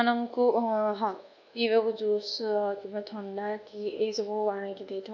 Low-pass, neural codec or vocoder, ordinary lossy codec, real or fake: 7.2 kHz; codec, 24 kHz, 1.2 kbps, DualCodec; none; fake